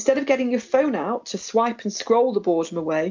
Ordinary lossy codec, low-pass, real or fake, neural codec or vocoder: MP3, 64 kbps; 7.2 kHz; real; none